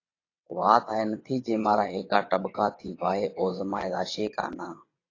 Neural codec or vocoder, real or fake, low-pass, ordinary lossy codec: vocoder, 22.05 kHz, 80 mel bands, WaveNeXt; fake; 7.2 kHz; AAC, 32 kbps